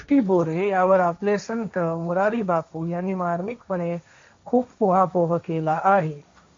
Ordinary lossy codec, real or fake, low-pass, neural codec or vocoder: MP3, 48 kbps; fake; 7.2 kHz; codec, 16 kHz, 1.1 kbps, Voila-Tokenizer